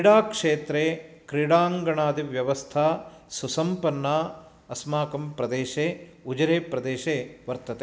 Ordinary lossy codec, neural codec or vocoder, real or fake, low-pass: none; none; real; none